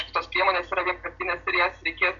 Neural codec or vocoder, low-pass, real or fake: none; 7.2 kHz; real